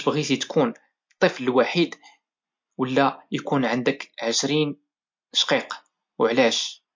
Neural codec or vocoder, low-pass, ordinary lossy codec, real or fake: none; 7.2 kHz; MP3, 48 kbps; real